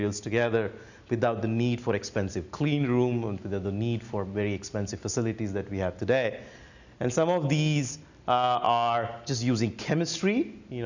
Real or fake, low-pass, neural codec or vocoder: real; 7.2 kHz; none